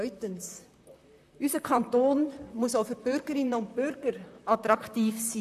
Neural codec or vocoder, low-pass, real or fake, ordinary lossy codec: vocoder, 44.1 kHz, 128 mel bands, Pupu-Vocoder; 14.4 kHz; fake; AAC, 96 kbps